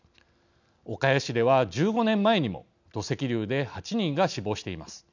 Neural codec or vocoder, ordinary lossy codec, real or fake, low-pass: none; none; real; 7.2 kHz